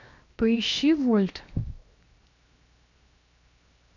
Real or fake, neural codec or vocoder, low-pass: fake; codec, 16 kHz, 0.7 kbps, FocalCodec; 7.2 kHz